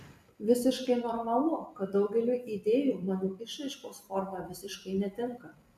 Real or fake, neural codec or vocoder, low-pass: fake; vocoder, 44.1 kHz, 128 mel bands every 256 samples, BigVGAN v2; 14.4 kHz